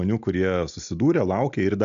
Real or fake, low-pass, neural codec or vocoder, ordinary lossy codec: real; 7.2 kHz; none; AAC, 96 kbps